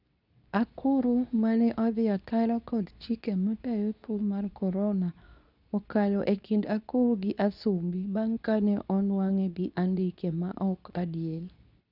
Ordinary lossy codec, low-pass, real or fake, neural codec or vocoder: none; 5.4 kHz; fake; codec, 24 kHz, 0.9 kbps, WavTokenizer, medium speech release version 2